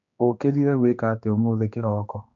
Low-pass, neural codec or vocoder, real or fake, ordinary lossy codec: 7.2 kHz; codec, 16 kHz, 2 kbps, X-Codec, HuBERT features, trained on general audio; fake; none